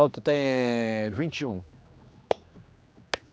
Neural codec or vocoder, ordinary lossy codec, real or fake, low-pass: codec, 16 kHz, 2 kbps, X-Codec, HuBERT features, trained on general audio; none; fake; none